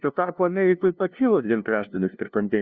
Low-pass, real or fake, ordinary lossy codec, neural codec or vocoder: 7.2 kHz; fake; Opus, 64 kbps; codec, 16 kHz, 0.5 kbps, FunCodec, trained on LibriTTS, 25 frames a second